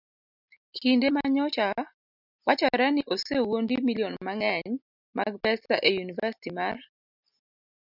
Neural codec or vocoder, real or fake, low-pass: none; real; 5.4 kHz